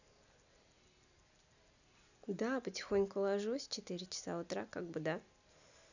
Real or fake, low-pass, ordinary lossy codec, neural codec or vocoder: real; 7.2 kHz; none; none